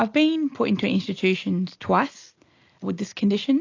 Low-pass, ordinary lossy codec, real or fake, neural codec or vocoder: 7.2 kHz; AAC, 48 kbps; real; none